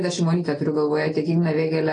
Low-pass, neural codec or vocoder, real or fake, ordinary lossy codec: 9.9 kHz; none; real; AAC, 32 kbps